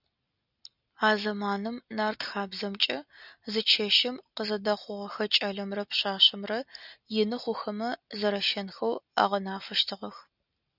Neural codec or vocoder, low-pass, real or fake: none; 5.4 kHz; real